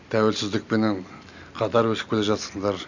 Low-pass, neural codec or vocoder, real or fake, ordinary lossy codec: 7.2 kHz; none; real; none